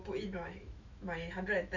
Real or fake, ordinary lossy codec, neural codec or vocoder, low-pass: fake; none; autoencoder, 48 kHz, 128 numbers a frame, DAC-VAE, trained on Japanese speech; 7.2 kHz